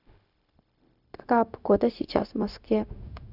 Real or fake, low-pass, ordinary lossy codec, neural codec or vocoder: fake; 5.4 kHz; none; codec, 16 kHz, 0.4 kbps, LongCat-Audio-Codec